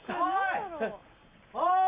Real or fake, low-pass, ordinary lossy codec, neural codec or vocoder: real; 3.6 kHz; Opus, 64 kbps; none